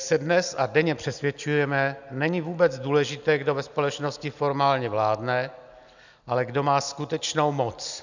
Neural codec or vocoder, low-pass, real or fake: none; 7.2 kHz; real